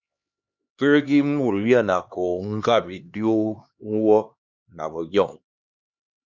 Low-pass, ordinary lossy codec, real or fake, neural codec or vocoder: 7.2 kHz; Opus, 64 kbps; fake; codec, 16 kHz, 2 kbps, X-Codec, HuBERT features, trained on LibriSpeech